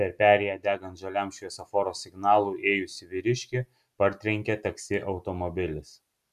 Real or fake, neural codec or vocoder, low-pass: real; none; 14.4 kHz